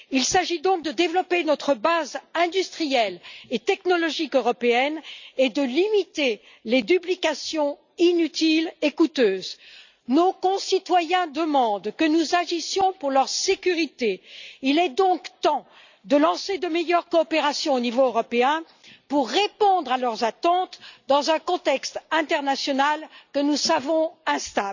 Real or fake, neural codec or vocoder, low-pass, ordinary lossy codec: real; none; 7.2 kHz; none